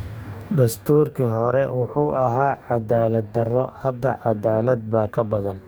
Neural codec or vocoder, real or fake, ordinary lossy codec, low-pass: codec, 44.1 kHz, 2.6 kbps, DAC; fake; none; none